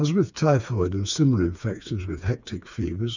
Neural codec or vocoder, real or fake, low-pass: codec, 16 kHz, 4 kbps, FreqCodec, smaller model; fake; 7.2 kHz